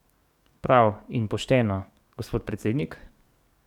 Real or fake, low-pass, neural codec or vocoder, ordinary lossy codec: fake; 19.8 kHz; codec, 44.1 kHz, 7.8 kbps, DAC; none